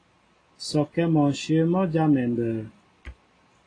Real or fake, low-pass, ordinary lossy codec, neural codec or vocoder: real; 9.9 kHz; AAC, 32 kbps; none